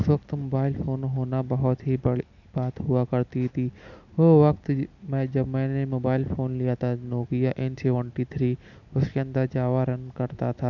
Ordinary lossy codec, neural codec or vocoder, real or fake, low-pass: none; none; real; 7.2 kHz